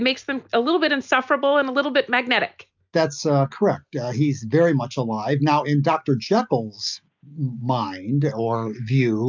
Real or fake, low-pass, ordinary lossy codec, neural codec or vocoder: real; 7.2 kHz; MP3, 64 kbps; none